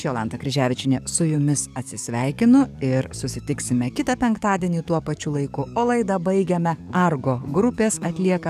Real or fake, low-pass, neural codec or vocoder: fake; 14.4 kHz; codec, 44.1 kHz, 7.8 kbps, DAC